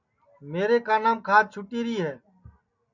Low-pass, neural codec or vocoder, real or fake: 7.2 kHz; none; real